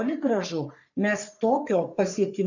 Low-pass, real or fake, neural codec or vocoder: 7.2 kHz; fake; codec, 44.1 kHz, 3.4 kbps, Pupu-Codec